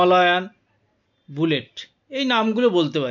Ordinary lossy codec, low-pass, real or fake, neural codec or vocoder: MP3, 64 kbps; 7.2 kHz; real; none